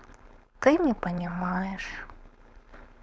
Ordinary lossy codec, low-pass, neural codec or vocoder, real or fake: none; none; codec, 16 kHz, 4.8 kbps, FACodec; fake